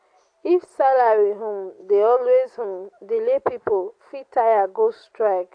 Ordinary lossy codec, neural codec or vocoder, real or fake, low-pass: AAC, 48 kbps; none; real; 9.9 kHz